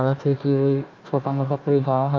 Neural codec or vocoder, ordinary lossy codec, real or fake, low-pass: codec, 16 kHz, 1 kbps, FunCodec, trained on Chinese and English, 50 frames a second; Opus, 32 kbps; fake; 7.2 kHz